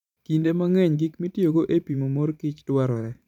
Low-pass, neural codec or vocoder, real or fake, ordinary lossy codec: 19.8 kHz; vocoder, 44.1 kHz, 128 mel bands every 512 samples, BigVGAN v2; fake; none